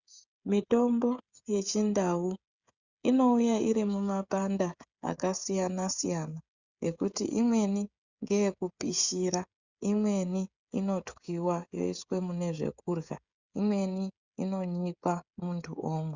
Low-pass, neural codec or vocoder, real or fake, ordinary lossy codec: 7.2 kHz; codec, 16 kHz, 16 kbps, FreqCodec, smaller model; fake; Opus, 64 kbps